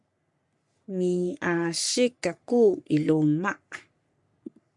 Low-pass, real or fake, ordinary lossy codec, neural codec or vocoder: 10.8 kHz; fake; MP3, 64 kbps; codec, 44.1 kHz, 3.4 kbps, Pupu-Codec